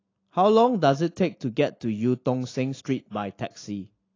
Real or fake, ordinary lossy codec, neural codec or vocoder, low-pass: real; AAC, 32 kbps; none; 7.2 kHz